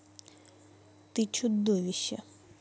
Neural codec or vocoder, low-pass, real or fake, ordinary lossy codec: none; none; real; none